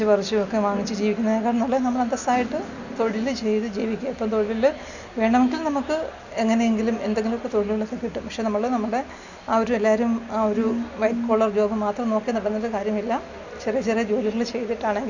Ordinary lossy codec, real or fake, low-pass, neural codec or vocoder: none; real; 7.2 kHz; none